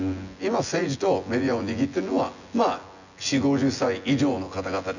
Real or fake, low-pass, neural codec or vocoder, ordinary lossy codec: fake; 7.2 kHz; vocoder, 24 kHz, 100 mel bands, Vocos; none